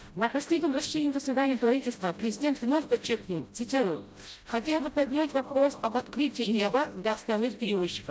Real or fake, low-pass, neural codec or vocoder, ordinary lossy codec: fake; none; codec, 16 kHz, 0.5 kbps, FreqCodec, smaller model; none